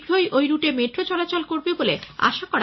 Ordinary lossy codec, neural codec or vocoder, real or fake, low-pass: MP3, 24 kbps; none; real; 7.2 kHz